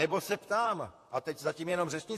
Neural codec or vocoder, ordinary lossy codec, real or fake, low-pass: vocoder, 44.1 kHz, 128 mel bands, Pupu-Vocoder; AAC, 64 kbps; fake; 14.4 kHz